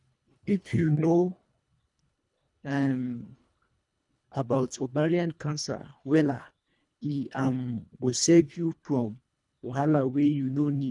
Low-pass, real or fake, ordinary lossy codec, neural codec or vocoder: 10.8 kHz; fake; none; codec, 24 kHz, 1.5 kbps, HILCodec